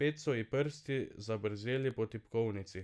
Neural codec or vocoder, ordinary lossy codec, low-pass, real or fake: none; none; none; real